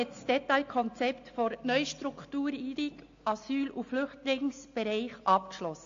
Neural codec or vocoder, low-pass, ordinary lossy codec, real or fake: none; 7.2 kHz; AAC, 48 kbps; real